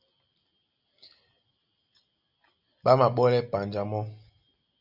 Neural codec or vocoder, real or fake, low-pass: none; real; 5.4 kHz